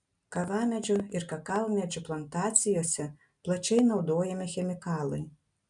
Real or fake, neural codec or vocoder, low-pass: real; none; 10.8 kHz